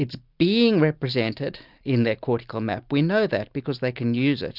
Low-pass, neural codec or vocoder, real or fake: 5.4 kHz; none; real